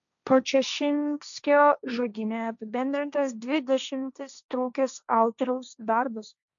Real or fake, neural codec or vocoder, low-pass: fake; codec, 16 kHz, 1.1 kbps, Voila-Tokenizer; 7.2 kHz